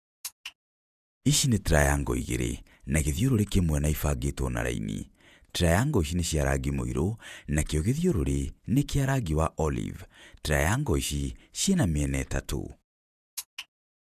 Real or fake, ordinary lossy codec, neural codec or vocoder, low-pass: fake; none; vocoder, 48 kHz, 128 mel bands, Vocos; 14.4 kHz